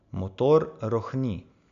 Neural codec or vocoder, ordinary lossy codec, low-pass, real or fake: none; AAC, 96 kbps; 7.2 kHz; real